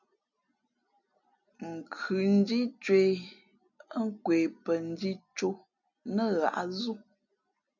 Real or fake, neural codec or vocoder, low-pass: real; none; 7.2 kHz